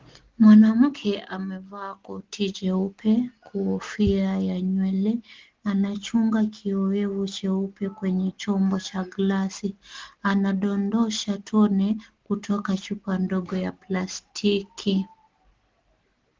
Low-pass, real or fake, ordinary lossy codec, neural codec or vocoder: 7.2 kHz; real; Opus, 16 kbps; none